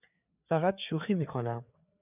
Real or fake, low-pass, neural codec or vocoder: fake; 3.6 kHz; codec, 16 kHz, 4 kbps, FreqCodec, larger model